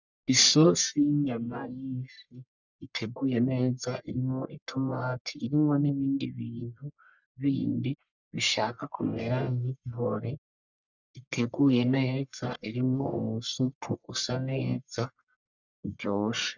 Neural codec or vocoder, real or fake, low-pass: codec, 44.1 kHz, 1.7 kbps, Pupu-Codec; fake; 7.2 kHz